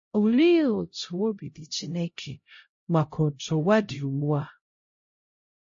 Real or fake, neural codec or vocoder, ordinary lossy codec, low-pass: fake; codec, 16 kHz, 0.5 kbps, X-Codec, HuBERT features, trained on LibriSpeech; MP3, 32 kbps; 7.2 kHz